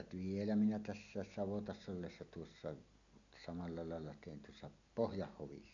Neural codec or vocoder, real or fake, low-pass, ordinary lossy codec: none; real; 7.2 kHz; none